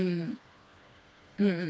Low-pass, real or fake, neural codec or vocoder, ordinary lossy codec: none; fake; codec, 16 kHz, 2 kbps, FreqCodec, smaller model; none